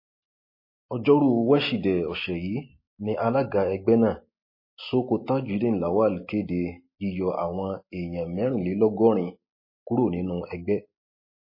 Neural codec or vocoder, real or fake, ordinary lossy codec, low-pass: none; real; MP3, 24 kbps; 5.4 kHz